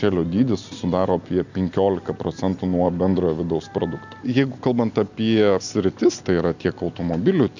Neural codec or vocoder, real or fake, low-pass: none; real; 7.2 kHz